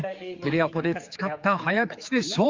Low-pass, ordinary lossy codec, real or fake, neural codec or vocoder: 7.2 kHz; Opus, 32 kbps; fake; codec, 16 kHz, 4 kbps, X-Codec, HuBERT features, trained on general audio